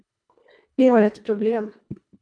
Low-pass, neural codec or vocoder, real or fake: 9.9 kHz; codec, 24 kHz, 1.5 kbps, HILCodec; fake